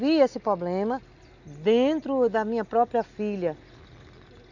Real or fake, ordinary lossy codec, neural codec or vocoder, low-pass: real; none; none; 7.2 kHz